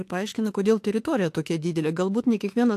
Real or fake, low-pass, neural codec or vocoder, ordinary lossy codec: fake; 14.4 kHz; autoencoder, 48 kHz, 32 numbers a frame, DAC-VAE, trained on Japanese speech; AAC, 64 kbps